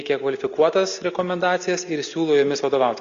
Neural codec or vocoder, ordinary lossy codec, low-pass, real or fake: none; Opus, 64 kbps; 7.2 kHz; real